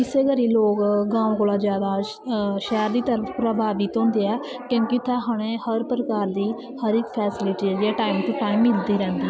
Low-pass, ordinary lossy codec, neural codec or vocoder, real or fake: none; none; none; real